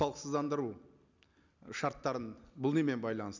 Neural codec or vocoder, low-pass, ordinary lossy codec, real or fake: none; 7.2 kHz; none; real